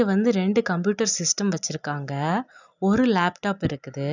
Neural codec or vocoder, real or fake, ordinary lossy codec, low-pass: none; real; none; 7.2 kHz